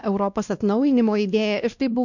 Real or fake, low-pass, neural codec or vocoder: fake; 7.2 kHz; codec, 16 kHz, 1 kbps, X-Codec, WavLM features, trained on Multilingual LibriSpeech